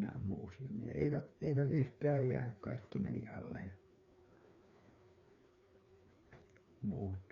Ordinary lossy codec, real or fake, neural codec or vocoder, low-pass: Opus, 64 kbps; fake; codec, 16 kHz, 2 kbps, FreqCodec, larger model; 7.2 kHz